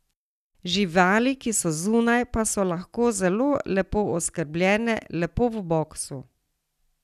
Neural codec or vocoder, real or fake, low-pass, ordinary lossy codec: none; real; 14.4 kHz; none